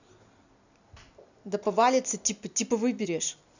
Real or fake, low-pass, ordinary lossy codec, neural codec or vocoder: real; 7.2 kHz; none; none